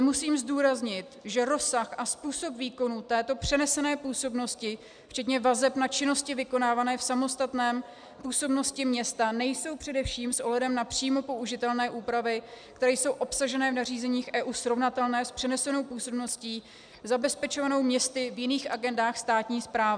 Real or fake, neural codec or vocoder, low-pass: real; none; 9.9 kHz